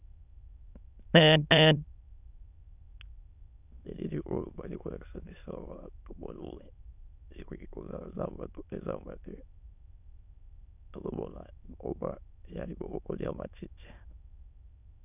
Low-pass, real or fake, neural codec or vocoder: 3.6 kHz; fake; autoencoder, 22.05 kHz, a latent of 192 numbers a frame, VITS, trained on many speakers